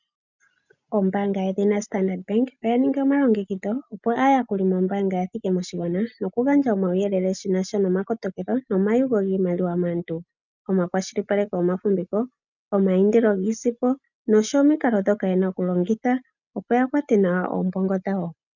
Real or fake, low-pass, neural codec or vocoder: real; 7.2 kHz; none